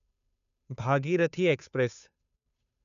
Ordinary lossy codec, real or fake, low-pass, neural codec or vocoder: none; fake; 7.2 kHz; codec, 16 kHz, 2 kbps, FunCodec, trained on Chinese and English, 25 frames a second